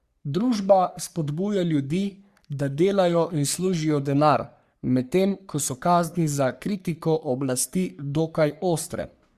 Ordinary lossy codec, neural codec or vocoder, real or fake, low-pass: Opus, 64 kbps; codec, 44.1 kHz, 3.4 kbps, Pupu-Codec; fake; 14.4 kHz